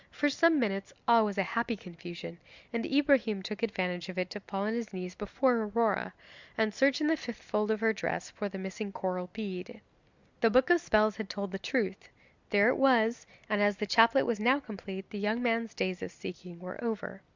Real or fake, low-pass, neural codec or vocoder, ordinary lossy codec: real; 7.2 kHz; none; Opus, 64 kbps